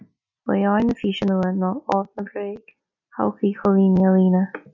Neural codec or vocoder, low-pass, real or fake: none; 7.2 kHz; real